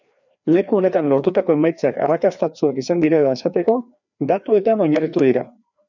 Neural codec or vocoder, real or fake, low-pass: codec, 16 kHz, 2 kbps, FreqCodec, larger model; fake; 7.2 kHz